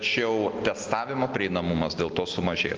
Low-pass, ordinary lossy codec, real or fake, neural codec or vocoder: 7.2 kHz; Opus, 32 kbps; real; none